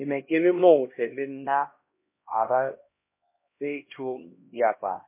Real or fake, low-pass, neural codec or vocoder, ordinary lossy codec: fake; 3.6 kHz; codec, 16 kHz, 1 kbps, X-Codec, HuBERT features, trained on LibriSpeech; MP3, 24 kbps